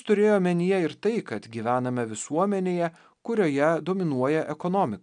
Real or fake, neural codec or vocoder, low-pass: real; none; 9.9 kHz